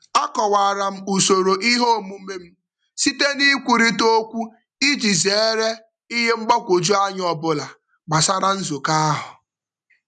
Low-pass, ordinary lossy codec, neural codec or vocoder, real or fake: 10.8 kHz; none; none; real